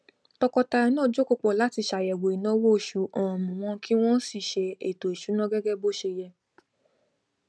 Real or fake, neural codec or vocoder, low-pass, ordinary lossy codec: real; none; none; none